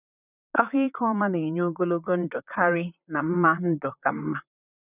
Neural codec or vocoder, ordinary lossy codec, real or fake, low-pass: vocoder, 44.1 kHz, 128 mel bands, Pupu-Vocoder; none; fake; 3.6 kHz